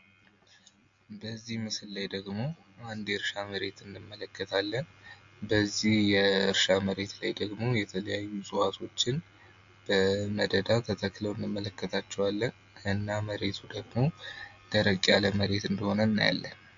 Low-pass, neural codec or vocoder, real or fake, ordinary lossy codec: 7.2 kHz; none; real; AAC, 64 kbps